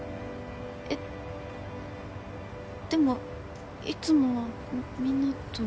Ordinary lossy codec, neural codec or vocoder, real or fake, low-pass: none; none; real; none